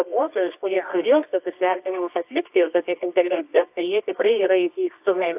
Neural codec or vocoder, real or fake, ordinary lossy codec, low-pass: codec, 24 kHz, 0.9 kbps, WavTokenizer, medium music audio release; fake; AAC, 32 kbps; 3.6 kHz